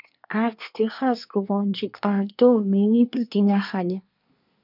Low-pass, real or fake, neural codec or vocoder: 5.4 kHz; fake; codec, 24 kHz, 1 kbps, SNAC